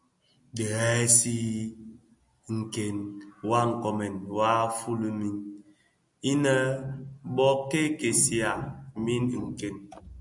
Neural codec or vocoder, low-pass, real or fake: none; 10.8 kHz; real